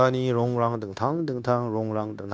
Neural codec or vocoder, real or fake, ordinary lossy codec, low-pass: codec, 16 kHz, 0.9 kbps, LongCat-Audio-Codec; fake; none; none